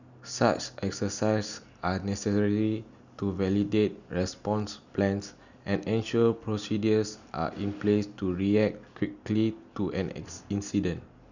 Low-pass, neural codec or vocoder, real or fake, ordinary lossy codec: 7.2 kHz; none; real; none